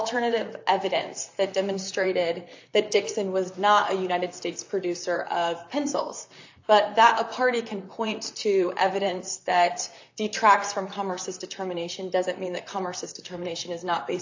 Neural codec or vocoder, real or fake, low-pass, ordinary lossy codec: vocoder, 44.1 kHz, 128 mel bands, Pupu-Vocoder; fake; 7.2 kHz; AAC, 48 kbps